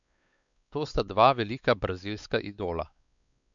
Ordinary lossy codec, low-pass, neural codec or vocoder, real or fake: none; 7.2 kHz; codec, 16 kHz, 4 kbps, X-Codec, WavLM features, trained on Multilingual LibriSpeech; fake